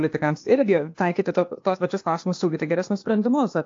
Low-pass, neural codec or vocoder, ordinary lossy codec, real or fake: 7.2 kHz; codec, 16 kHz, 0.8 kbps, ZipCodec; AAC, 48 kbps; fake